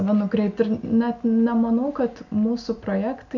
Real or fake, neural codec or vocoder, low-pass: real; none; 7.2 kHz